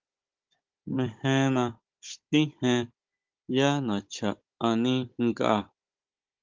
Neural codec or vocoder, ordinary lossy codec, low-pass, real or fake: codec, 16 kHz, 16 kbps, FunCodec, trained on Chinese and English, 50 frames a second; Opus, 32 kbps; 7.2 kHz; fake